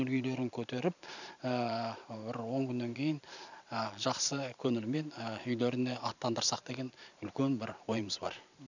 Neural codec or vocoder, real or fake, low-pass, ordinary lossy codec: vocoder, 44.1 kHz, 128 mel bands every 512 samples, BigVGAN v2; fake; 7.2 kHz; none